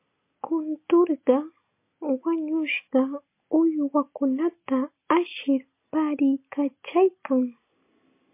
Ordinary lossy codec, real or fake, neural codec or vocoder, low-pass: MP3, 24 kbps; real; none; 3.6 kHz